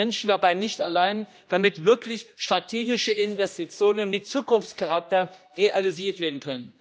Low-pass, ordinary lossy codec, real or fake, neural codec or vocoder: none; none; fake; codec, 16 kHz, 1 kbps, X-Codec, HuBERT features, trained on general audio